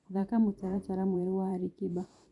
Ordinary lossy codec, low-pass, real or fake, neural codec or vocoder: none; 10.8 kHz; fake; vocoder, 44.1 kHz, 128 mel bands every 512 samples, BigVGAN v2